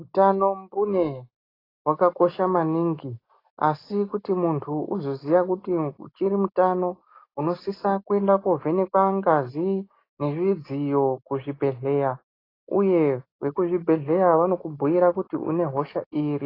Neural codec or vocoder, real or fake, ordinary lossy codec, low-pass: none; real; AAC, 24 kbps; 5.4 kHz